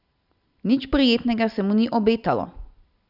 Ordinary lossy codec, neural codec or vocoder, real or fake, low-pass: Opus, 64 kbps; none; real; 5.4 kHz